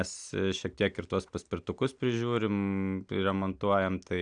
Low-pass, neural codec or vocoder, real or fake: 9.9 kHz; none; real